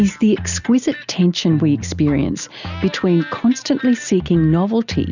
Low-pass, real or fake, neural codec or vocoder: 7.2 kHz; real; none